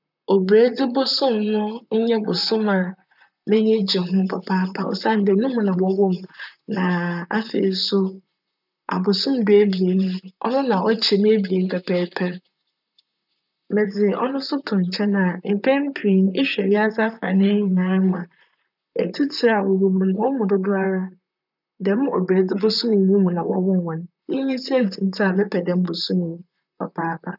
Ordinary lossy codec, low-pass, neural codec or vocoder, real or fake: none; 5.4 kHz; vocoder, 44.1 kHz, 128 mel bands, Pupu-Vocoder; fake